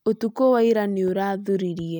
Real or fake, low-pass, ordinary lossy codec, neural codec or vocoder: real; none; none; none